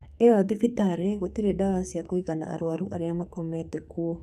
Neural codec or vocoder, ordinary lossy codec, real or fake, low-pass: codec, 44.1 kHz, 2.6 kbps, SNAC; none; fake; 14.4 kHz